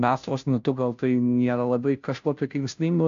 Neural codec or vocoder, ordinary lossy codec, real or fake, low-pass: codec, 16 kHz, 0.5 kbps, FunCodec, trained on Chinese and English, 25 frames a second; AAC, 64 kbps; fake; 7.2 kHz